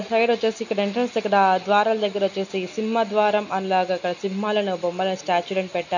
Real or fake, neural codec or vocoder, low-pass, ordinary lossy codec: real; none; 7.2 kHz; none